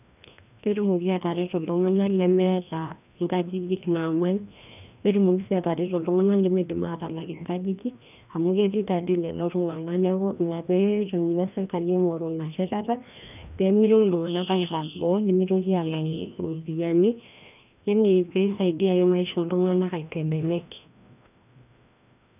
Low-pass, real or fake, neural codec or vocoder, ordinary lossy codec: 3.6 kHz; fake; codec, 16 kHz, 1 kbps, FreqCodec, larger model; none